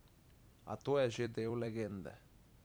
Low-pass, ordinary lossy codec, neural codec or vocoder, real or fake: none; none; vocoder, 44.1 kHz, 128 mel bands every 256 samples, BigVGAN v2; fake